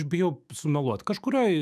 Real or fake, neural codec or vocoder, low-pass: fake; autoencoder, 48 kHz, 128 numbers a frame, DAC-VAE, trained on Japanese speech; 14.4 kHz